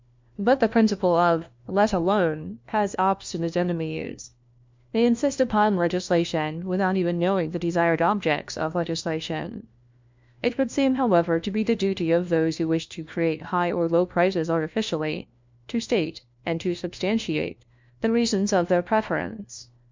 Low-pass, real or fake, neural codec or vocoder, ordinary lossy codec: 7.2 kHz; fake; codec, 16 kHz, 1 kbps, FunCodec, trained on LibriTTS, 50 frames a second; MP3, 64 kbps